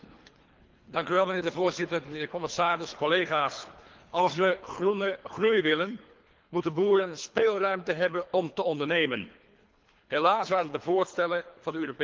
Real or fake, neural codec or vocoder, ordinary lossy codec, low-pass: fake; codec, 24 kHz, 3 kbps, HILCodec; Opus, 32 kbps; 7.2 kHz